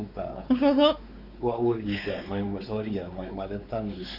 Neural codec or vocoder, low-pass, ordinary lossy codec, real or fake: codec, 16 kHz, 4 kbps, X-Codec, WavLM features, trained on Multilingual LibriSpeech; 5.4 kHz; none; fake